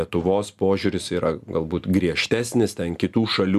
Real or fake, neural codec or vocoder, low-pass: real; none; 14.4 kHz